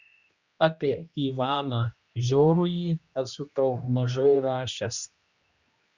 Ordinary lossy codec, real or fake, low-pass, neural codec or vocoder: Opus, 64 kbps; fake; 7.2 kHz; codec, 16 kHz, 1 kbps, X-Codec, HuBERT features, trained on general audio